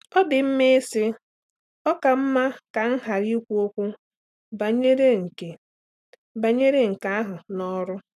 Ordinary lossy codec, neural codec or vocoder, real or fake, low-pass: none; none; real; 14.4 kHz